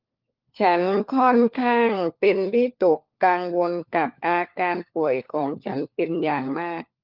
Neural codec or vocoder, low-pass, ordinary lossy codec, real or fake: codec, 16 kHz, 4 kbps, FunCodec, trained on LibriTTS, 50 frames a second; 5.4 kHz; Opus, 32 kbps; fake